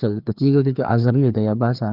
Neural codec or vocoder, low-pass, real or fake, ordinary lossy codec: codec, 16 kHz, 2 kbps, FreqCodec, larger model; 5.4 kHz; fake; Opus, 32 kbps